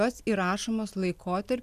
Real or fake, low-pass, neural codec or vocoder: real; 14.4 kHz; none